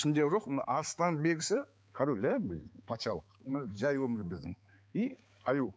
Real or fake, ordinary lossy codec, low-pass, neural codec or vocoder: fake; none; none; codec, 16 kHz, 4 kbps, X-Codec, HuBERT features, trained on balanced general audio